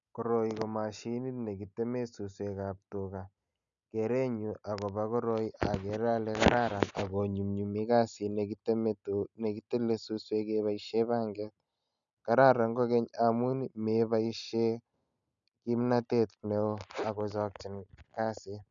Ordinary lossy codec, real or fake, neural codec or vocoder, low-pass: none; real; none; 7.2 kHz